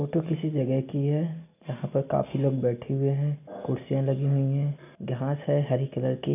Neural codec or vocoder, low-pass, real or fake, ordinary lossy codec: none; 3.6 kHz; real; none